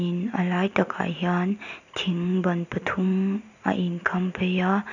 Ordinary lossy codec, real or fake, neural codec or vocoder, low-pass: none; real; none; 7.2 kHz